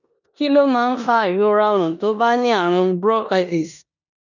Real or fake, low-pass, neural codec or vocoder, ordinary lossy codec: fake; 7.2 kHz; codec, 16 kHz in and 24 kHz out, 0.9 kbps, LongCat-Audio-Codec, four codebook decoder; none